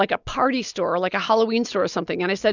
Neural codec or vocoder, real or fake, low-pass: none; real; 7.2 kHz